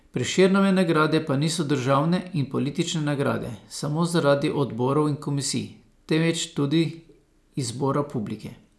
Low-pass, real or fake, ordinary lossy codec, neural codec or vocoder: none; real; none; none